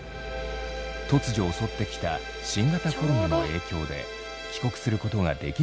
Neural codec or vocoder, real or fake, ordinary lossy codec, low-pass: none; real; none; none